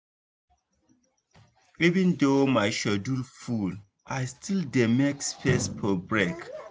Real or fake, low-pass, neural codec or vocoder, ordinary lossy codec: real; none; none; none